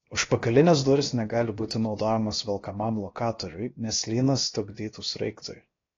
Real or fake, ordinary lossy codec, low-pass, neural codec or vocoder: fake; AAC, 32 kbps; 7.2 kHz; codec, 16 kHz, 0.7 kbps, FocalCodec